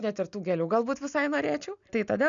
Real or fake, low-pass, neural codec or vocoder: real; 7.2 kHz; none